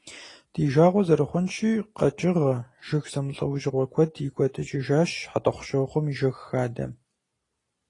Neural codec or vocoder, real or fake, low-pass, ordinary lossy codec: none; real; 10.8 kHz; AAC, 32 kbps